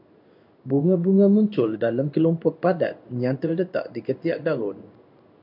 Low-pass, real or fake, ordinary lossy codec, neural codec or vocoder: 5.4 kHz; fake; MP3, 48 kbps; codec, 16 kHz in and 24 kHz out, 1 kbps, XY-Tokenizer